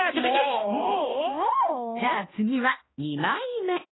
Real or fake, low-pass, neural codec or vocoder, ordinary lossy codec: fake; 7.2 kHz; codec, 16 kHz, 1 kbps, X-Codec, HuBERT features, trained on balanced general audio; AAC, 16 kbps